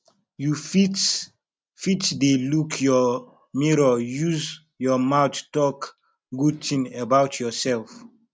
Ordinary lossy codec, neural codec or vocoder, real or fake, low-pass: none; none; real; none